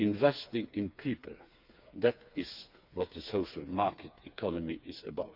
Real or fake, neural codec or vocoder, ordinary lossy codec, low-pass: fake; codec, 16 kHz, 4 kbps, FreqCodec, smaller model; none; 5.4 kHz